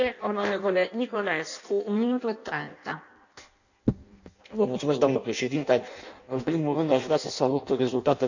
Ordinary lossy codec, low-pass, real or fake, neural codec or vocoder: none; 7.2 kHz; fake; codec, 16 kHz in and 24 kHz out, 0.6 kbps, FireRedTTS-2 codec